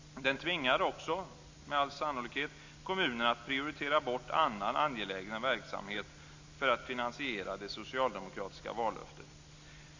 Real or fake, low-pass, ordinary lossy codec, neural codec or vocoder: real; 7.2 kHz; AAC, 48 kbps; none